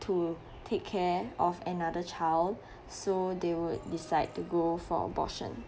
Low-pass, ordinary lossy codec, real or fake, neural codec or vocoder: none; none; real; none